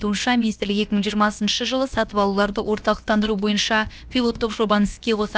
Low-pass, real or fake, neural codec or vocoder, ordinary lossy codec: none; fake; codec, 16 kHz, about 1 kbps, DyCAST, with the encoder's durations; none